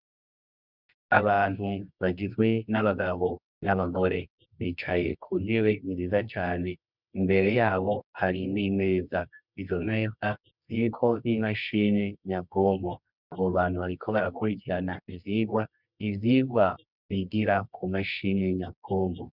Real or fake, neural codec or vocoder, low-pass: fake; codec, 24 kHz, 0.9 kbps, WavTokenizer, medium music audio release; 5.4 kHz